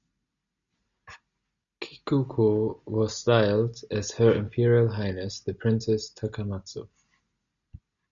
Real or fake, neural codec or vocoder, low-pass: real; none; 7.2 kHz